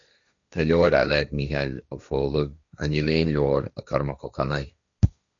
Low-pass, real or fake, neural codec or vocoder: 7.2 kHz; fake; codec, 16 kHz, 1.1 kbps, Voila-Tokenizer